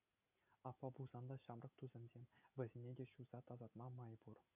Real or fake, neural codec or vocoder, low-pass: real; none; 3.6 kHz